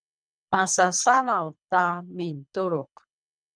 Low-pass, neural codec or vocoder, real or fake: 9.9 kHz; codec, 24 kHz, 3 kbps, HILCodec; fake